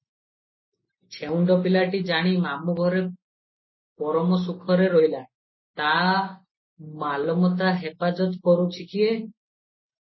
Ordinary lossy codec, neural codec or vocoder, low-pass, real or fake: MP3, 24 kbps; none; 7.2 kHz; real